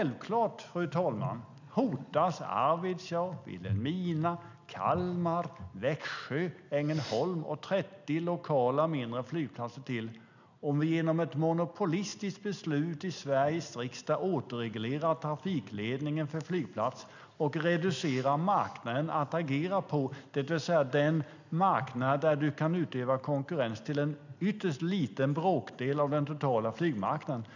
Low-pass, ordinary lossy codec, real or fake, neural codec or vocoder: 7.2 kHz; none; real; none